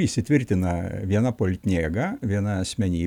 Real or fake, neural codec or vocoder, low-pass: real; none; 19.8 kHz